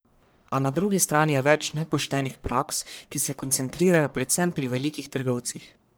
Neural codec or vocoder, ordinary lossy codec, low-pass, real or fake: codec, 44.1 kHz, 1.7 kbps, Pupu-Codec; none; none; fake